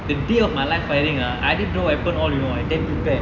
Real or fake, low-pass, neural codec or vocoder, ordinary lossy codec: real; 7.2 kHz; none; none